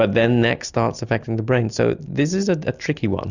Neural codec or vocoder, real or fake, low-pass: none; real; 7.2 kHz